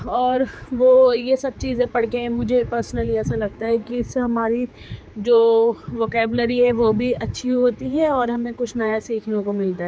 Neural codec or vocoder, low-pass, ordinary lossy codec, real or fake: codec, 16 kHz, 4 kbps, X-Codec, HuBERT features, trained on general audio; none; none; fake